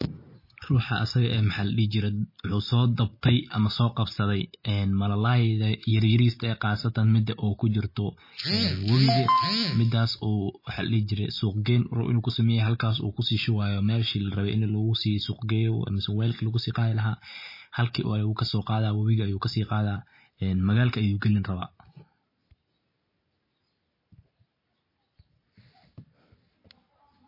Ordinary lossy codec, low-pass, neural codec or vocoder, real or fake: MP3, 24 kbps; 5.4 kHz; none; real